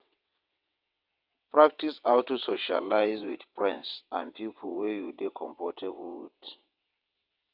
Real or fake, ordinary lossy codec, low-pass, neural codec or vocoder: fake; MP3, 48 kbps; 5.4 kHz; vocoder, 22.05 kHz, 80 mel bands, WaveNeXt